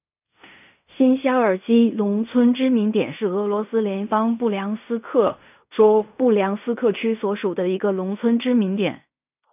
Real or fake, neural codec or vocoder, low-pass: fake; codec, 16 kHz in and 24 kHz out, 0.4 kbps, LongCat-Audio-Codec, fine tuned four codebook decoder; 3.6 kHz